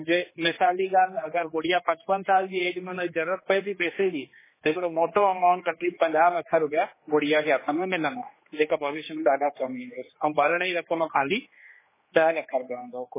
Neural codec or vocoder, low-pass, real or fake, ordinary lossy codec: codec, 16 kHz, 2 kbps, X-Codec, HuBERT features, trained on general audio; 3.6 kHz; fake; MP3, 16 kbps